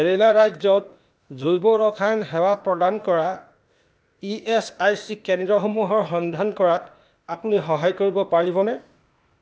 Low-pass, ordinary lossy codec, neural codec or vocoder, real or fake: none; none; codec, 16 kHz, 0.8 kbps, ZipCodec; fake